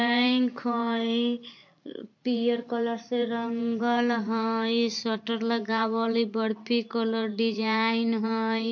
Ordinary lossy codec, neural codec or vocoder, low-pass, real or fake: MP3, 48 kbps; vocoder, 44.1 kHz, 128 mel bands every 512 samples, BigVGAN v2; 7.2 kHz; fake